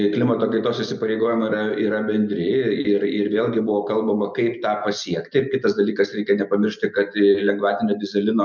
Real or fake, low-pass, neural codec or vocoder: real; 7.2 kHz; none